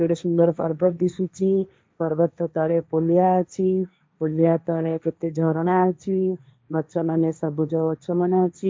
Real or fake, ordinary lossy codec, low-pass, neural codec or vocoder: fake; none; none; codec, 16 kHz, 1.1 kbps, Voila-Tokenizer